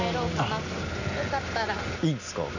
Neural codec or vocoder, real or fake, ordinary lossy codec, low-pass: none; real; none; 7.2 kHz